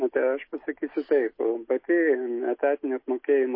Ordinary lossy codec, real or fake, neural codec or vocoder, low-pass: MP3, 24 kbps; real; none; 5.4 kHz